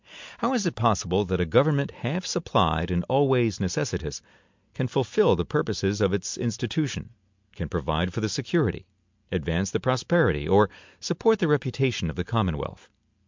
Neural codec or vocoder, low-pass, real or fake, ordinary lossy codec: none; 7.2 kHz; real; MP3, 64 kbps